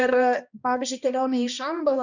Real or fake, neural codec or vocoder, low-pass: fake; codec, 16 kHz, 1 kbps, X-Codec, HuBERT features, trained on balanced general audio; 7.2 kHz